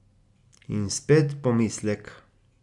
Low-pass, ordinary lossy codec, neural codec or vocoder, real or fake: 10.8 kHz; MP3, 96 kbps; none; real